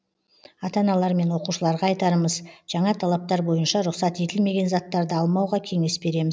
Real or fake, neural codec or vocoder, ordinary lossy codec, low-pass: real; none; none; none